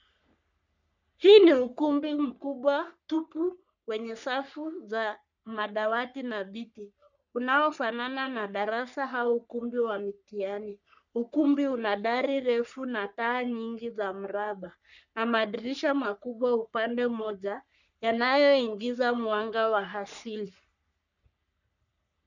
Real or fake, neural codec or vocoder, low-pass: fake; codec, 44.1 kHz, 3.4 kbps, Pupu-Codec; 7.2 kHz